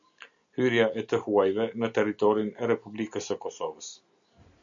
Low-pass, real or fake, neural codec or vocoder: 7.2 kHz; real; none